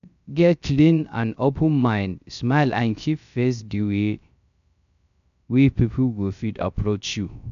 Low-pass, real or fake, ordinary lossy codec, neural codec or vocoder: 7.2 kHz; fake; none; codec, 16 kHz, 0.3 kbps, FocalCodec